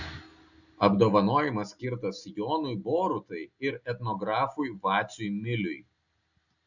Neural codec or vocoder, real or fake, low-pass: none; real; 7.2 kHz